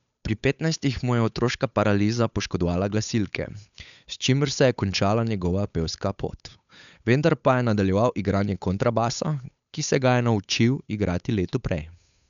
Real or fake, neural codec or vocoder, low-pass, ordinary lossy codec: fake; codec, 16 kHz, 8 kbps, FunCodec, trained on Chinese and English, 25 frames a second; 7.2 kHz; none